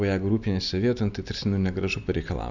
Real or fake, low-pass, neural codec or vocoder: real; 7.2 kHz; none